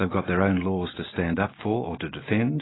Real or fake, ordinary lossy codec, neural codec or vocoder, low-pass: real; AAC, 16 kbps; none; 7.2 kHz